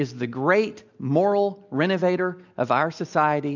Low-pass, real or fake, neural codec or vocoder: 7.2 kHz; real; none